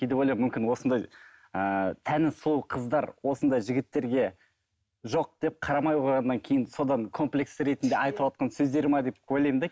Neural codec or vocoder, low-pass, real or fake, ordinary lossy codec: none; none; real; none